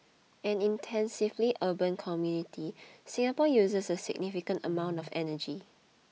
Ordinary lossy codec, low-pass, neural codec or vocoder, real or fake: none; none; none; real